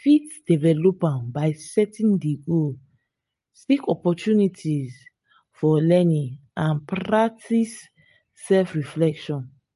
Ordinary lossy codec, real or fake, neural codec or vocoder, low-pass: MP3, 48 kbps; real; none; 14.4 kHz